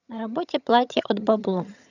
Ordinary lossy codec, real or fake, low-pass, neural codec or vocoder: none; fake; 7.2 kHz; vocoder, 22.05 kHz, 80 mel bands, HiFi-GAN